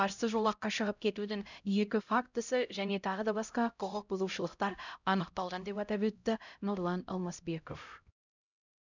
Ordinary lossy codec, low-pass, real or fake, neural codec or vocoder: none; 7.2 kHz; fake; codec, 16 kHz, 0.5 kbps, X-Codec, HuBERT features, trained on LibriSpeech